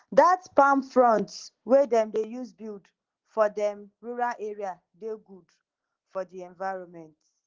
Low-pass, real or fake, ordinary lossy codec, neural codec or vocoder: 7.2 kHz; real; Opus, 16 kbps; none